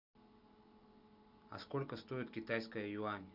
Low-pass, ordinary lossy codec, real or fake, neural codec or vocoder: 5.4 kHz; Opus, 64 kbps; real; none